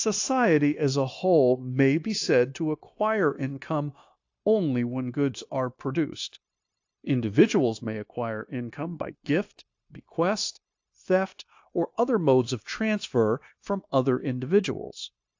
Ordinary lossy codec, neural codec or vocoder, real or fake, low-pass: AAC, 48 kbps; codec, 16 kHz, 0.9 kbps, LongCat-Audio-Codec; fake; 7.2 kHz